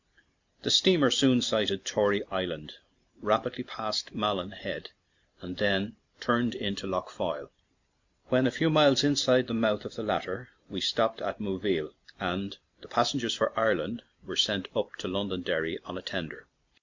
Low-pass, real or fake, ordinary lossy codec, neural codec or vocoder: 7.2 kHz; real; MP3, 64 kbps; none